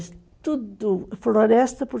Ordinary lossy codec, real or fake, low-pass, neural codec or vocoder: none; real; none; none